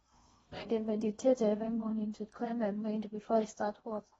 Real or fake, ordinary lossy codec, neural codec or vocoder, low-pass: fake; AAC, 24 kbps; codec, 16 kHz in and 24 kHz out, 0.8 kbps, FocalCodec, streaming, 65536 codes; 10.8 kHz